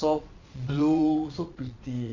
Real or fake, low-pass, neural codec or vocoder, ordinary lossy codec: fake; 7.2 kHz; vocoder, 22.05 kHz, 80 mel bands, Vocos; none